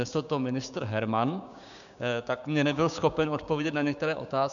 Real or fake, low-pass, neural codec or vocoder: fake; 7.2 kHz; codec, 16 kHz, 6 kbps, DAC